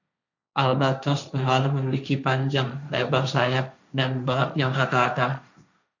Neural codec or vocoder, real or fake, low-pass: codec, 16 kHz, 1.1 kbps, Voila-Tokenizer; fake; 7.2 kHz